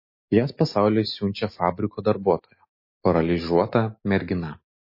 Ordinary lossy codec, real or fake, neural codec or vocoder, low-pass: MP3, 24 kbps; real; none; 5.4 kHz